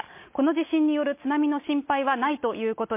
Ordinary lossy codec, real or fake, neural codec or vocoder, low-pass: MP3, 24 kbps; real; none; 3.6 kHz